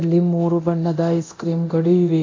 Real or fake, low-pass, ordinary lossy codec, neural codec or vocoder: fake; 7.2 kHz; MP3, 64 kbps; codec, 24 kHz, 0.9 kbps, DualCodec